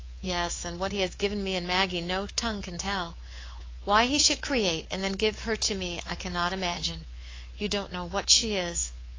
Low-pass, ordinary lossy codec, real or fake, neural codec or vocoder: 7.2 kHz; AAC, 32 kbps; fake; codec, 24 kHz, 3.1 kbps, DualCodec